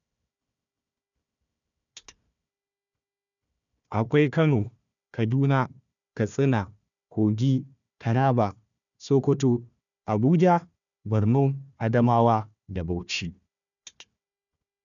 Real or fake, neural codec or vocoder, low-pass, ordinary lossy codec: fake; codec, 16 kHz, 1 kbps, FunCodec, trained on Chinese and English, 50 frames a second; 7.2 kHz; none